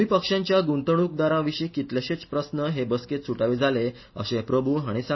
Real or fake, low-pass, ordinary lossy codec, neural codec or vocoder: real; 7.2 kHz; MP3, 24 kbps; none